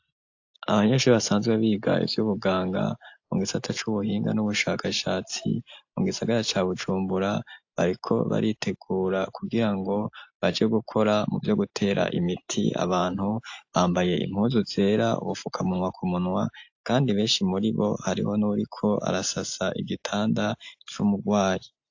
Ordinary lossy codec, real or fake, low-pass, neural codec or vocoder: AAC, 48 kbps; real; 7.2 kHz; none